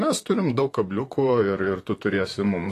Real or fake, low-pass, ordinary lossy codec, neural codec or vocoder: fake; 14.4 kHz; AAC, 48 kbps; vocoder, 44.1 kHz, 128 mel bands, Pupu-Vocoder